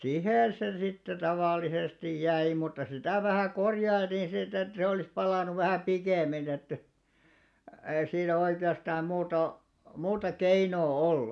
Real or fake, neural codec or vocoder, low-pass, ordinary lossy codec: real; none; none; none